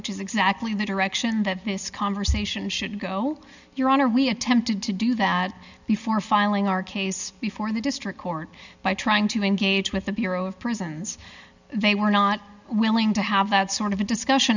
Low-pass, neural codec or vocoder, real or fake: 7.2 kHz; none; real